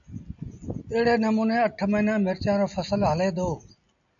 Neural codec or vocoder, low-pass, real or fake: none; 7.2 kHz; real